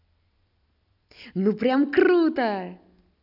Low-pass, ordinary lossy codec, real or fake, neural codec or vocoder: 5.4 kHz; none; real; none